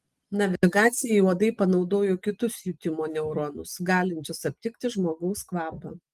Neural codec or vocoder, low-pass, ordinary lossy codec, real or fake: none; 14.4 kHz; Opus, 24 kbps; real